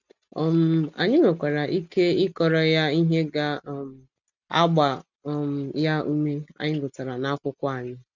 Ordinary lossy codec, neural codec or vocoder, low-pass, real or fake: none; none; 7.2 kHz; real